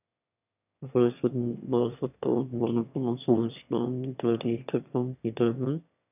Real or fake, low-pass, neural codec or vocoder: fake; 3.6 kHz; autoencoder, 22.05 kHz, a latent of 192 numbers a frame, VITS, trained on one speaker